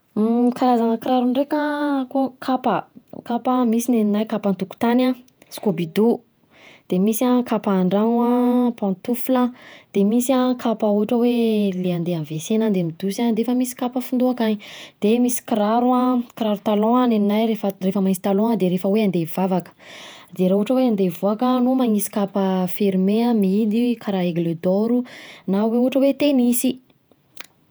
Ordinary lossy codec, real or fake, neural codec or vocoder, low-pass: none; fake; vocoder, 48 kHz, 128 mel bands, Vocos; none